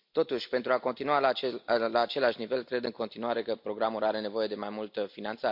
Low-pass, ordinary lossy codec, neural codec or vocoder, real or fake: 5.4 kHz; MP3, 48 kbps; none; real